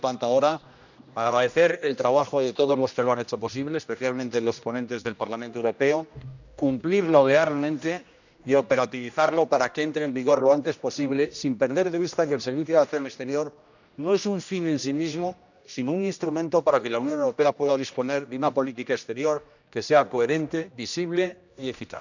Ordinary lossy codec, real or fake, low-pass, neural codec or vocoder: none; fake; 7.2 kHz; codec, 16 kHz, 1 kbps, X-Codec, HuBERT features, trained on general audio